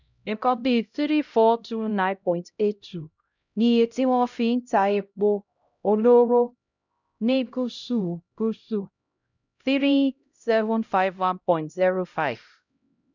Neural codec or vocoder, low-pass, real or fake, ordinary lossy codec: codec, 16 kHz, 0.5 kbps, X-Codec, HuBERT features, trained on LibriSpeech; 7.2 kHz; fake; none